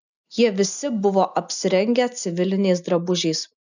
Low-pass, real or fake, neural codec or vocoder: 7.2 kHz; real; none